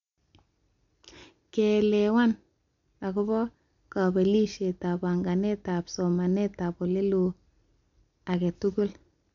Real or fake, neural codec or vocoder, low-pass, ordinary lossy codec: real; none; 7.2 kHz; MP3, 64 kbps